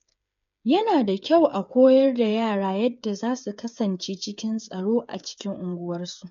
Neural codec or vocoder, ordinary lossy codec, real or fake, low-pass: codec, 16 kHz, 8 kbps, FreqCodec, smaller model; none; fake; 7.2 kHz